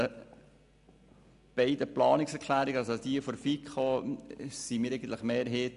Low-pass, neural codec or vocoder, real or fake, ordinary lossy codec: 10.8 kHz; none; real; none